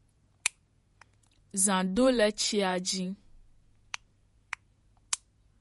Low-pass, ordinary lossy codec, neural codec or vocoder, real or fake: 19.8 kHz; MP3, 48 kbps; vocoder, 48 kHz, 128 mel bands, Vocos; fake